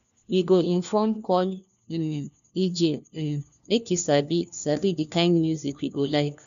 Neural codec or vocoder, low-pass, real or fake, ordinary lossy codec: codec, 16 kHz, 1 kbps, FunCodec, trained on LibriTTS, 50 frames a second; 7.2 kHz; fake; none